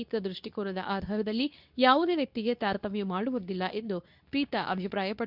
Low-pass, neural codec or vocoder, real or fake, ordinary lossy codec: 5.4 kHz; codec, 24 kHz, 0.9 kbps, WavTokenizer, medium speech release version 2; fake; none